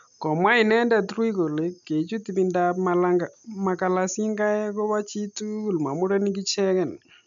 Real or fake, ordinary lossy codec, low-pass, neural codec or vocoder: real; none; 7.2 kHz; none